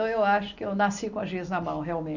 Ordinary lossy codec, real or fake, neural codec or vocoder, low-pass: none; real; none; 7.2 kHz